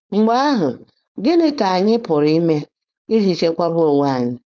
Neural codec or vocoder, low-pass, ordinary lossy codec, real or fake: codec, 16 kHz, 4.8 kbps, FACodec; none; none; fake